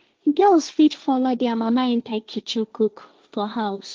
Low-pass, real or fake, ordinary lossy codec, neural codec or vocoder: 7.2 kHz; fake; Opus, 32 kbps; codec, 16 kHz, 1.1 kbps, Voila-Tokenizer